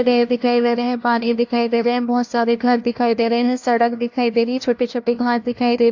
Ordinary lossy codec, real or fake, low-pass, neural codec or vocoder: AAC, 48 kbps; fake; 7.2 kHz; codec, 16 kHz, 1 kbps, FunCodec, trained on LibriTTS, 50 frames a second